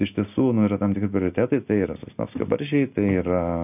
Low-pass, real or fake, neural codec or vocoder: 3.6 kHz; real; none